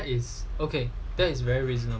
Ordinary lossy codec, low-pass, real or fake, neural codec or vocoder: none; none; real; none